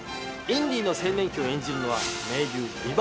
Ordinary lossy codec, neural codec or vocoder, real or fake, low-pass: none; none; real; none